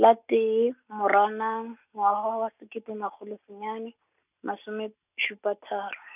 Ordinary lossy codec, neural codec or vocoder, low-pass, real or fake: none; none; 3.6 kHz; real